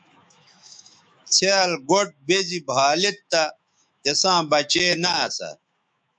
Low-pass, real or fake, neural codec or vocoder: 9.9 kHz; fake; autoencoder, 48 kHz, 128 numbers a frame, DAC-VAE, trained on Japanese speech